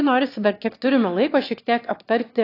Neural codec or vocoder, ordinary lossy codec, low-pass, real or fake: autoencoder, 22.05 kHz, a latent of 192 numbers a frame, VITS, trained on one speaker; AAC, 32 kbps; 5.4 kHz; fake